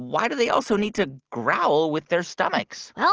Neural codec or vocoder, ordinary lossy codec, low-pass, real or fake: none; Opus, 16 kbps; 7.2 kHz; real